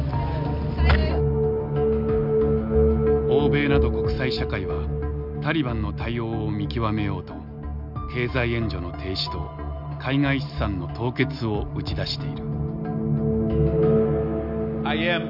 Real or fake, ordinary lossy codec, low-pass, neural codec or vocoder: real; none; 5.4 kHz; none